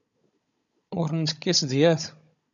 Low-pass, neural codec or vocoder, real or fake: 7.2 kHz; codec, 16 kHz, 16 kbps, FunCodec, trained on Chinese and English, 50 frames a second; fake